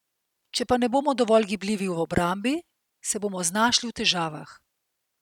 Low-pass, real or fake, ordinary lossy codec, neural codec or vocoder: 19.8 kHz; real; none; none